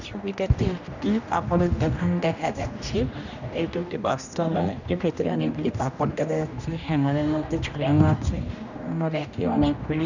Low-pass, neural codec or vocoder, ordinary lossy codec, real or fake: 7.2 kHz; codec, 16 kHz, 1 kbps, X-Codec, HuBERT features, trained on general audio; none; fake